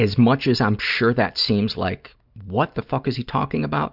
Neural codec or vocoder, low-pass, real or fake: none; 5.4 kHz; real